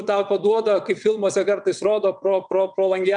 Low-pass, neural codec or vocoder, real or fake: 9.9 kHz; vocoder, 22.05 kHz, 80 mel bands, WaveNeXt; fake